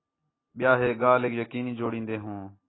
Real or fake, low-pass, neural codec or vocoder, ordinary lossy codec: real; 7.2 kHz; none; AAC, 16 kbps